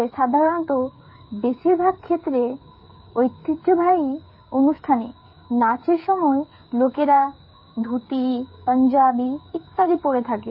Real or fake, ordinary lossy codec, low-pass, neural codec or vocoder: fake; MP3, 24 kbps; 5.4 kHz; codec, 16 kHz, 8 kbps, FreqCodec, smaller model